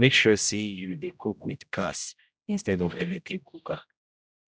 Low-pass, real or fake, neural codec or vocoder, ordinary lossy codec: none; fake; codec, 16 kHz, 0.5 kbps, X-Codec, HuBERT features, trained on general audio; none